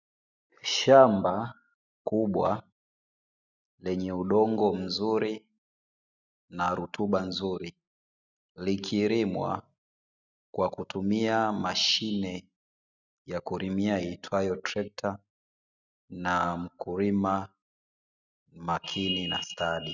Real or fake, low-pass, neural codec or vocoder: real; 7.2 kHz; none